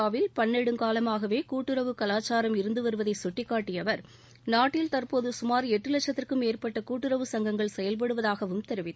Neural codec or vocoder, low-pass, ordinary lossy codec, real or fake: none; none; none; real